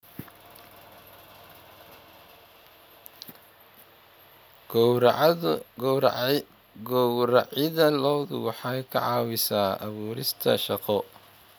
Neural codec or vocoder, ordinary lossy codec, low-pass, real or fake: none; none; none; real